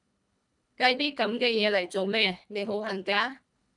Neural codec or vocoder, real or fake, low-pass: codec, 24 kHz, 1.5 kbps, HILCodec; fake; 10.8 kHz